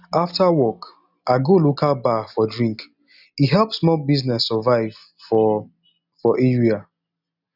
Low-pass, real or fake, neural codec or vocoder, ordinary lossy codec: 5.4 kHz; real; none; none